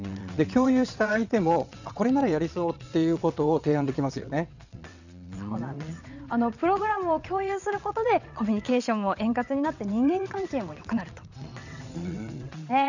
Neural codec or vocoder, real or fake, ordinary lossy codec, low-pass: vocoder, 22.05 kHz, 80 mel bands, WaveNeXt; fake; none; 7.2 kHz